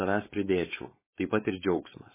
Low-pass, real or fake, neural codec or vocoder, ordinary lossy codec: 3.6 kHz; fake; codec, 16 kHz, 4.8 kbps, FACodec; MP3, 16 kbps